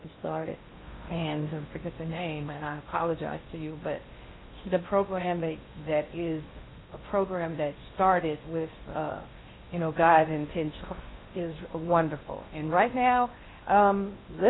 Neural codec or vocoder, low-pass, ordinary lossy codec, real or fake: codec, 16 kHz in and 24 kHz out, 0.6 kbps, FocalCodec, streaming, 2048 codes; 7.2 kHz; AAC, 16 kbps; fake